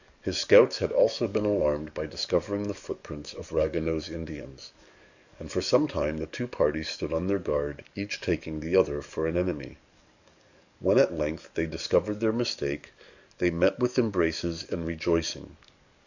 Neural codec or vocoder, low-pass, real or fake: codec, 44.1 kHz, 7.8 kbps, DAC; 7.2 kHz; fake